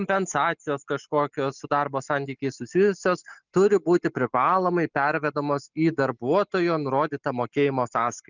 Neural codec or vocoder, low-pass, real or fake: none; 7.2 kHz; real